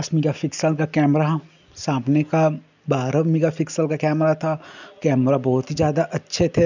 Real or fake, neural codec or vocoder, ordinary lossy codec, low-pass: real; none; none; 7.2 kHz